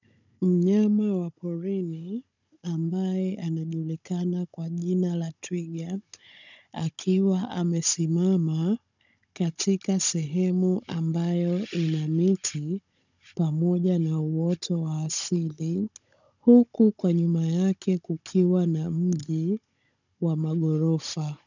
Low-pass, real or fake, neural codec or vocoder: 7.2 kHz; fake; codec, 16 kHz, 16 kbps, FunCodec, trained on Chinese and English, 50 frames a second